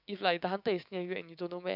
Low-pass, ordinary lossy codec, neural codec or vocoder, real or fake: 5.4 kHz; none; codec, 16 kHz, 6 kbps, DAC; fake